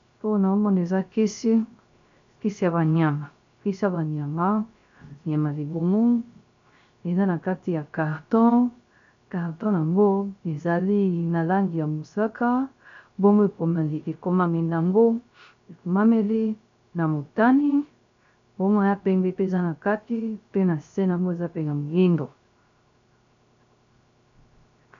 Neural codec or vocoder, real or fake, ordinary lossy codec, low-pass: codec, 16 kHz, 0.3 kbps, FocalCodec; fake; MP3, 64 kbps; 7.2 kHz